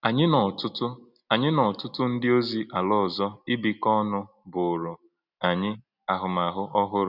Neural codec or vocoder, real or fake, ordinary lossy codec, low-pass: none; real; none; 5.4 kHz